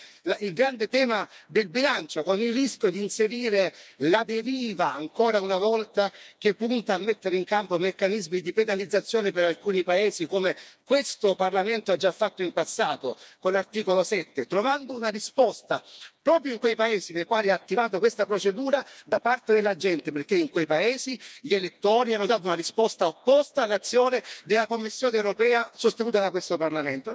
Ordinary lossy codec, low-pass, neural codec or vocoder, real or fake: none; none; codec, 16 kHz, 2 kbps, FreqCodec, smaller model; fake